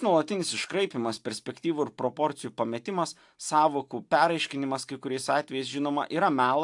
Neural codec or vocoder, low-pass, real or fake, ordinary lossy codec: none; 10.8 kHz; real; AAC, 64 kbps